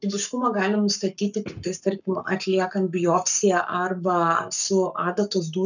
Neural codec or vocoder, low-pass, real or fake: codec, 44.1 kHz, 7.8 kbps, Pupu-Codec; 7.2 kHz; fake